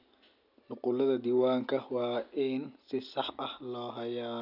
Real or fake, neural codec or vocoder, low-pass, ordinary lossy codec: real; none; 5.4 kHz; none